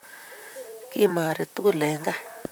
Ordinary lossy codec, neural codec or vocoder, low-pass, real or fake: none; vocoder, 44.1 kHz, 128 mel bands, Pupu-Vocoder; none; fake